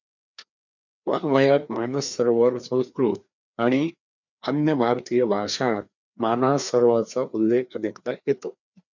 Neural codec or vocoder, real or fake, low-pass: codec, 16 kHz, 2 kbps, FreqCodec, larger model; fake; 7.2 kHz